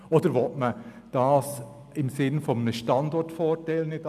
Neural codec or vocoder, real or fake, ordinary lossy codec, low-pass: none; real; none; 14.4 kHz